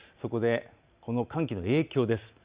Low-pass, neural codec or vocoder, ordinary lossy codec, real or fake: 3.6 kHz; none; Opus, 64 kbps; real